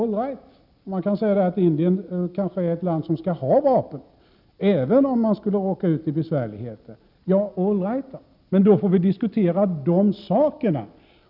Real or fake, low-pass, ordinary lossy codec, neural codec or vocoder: real; 5.4 kHz; none; none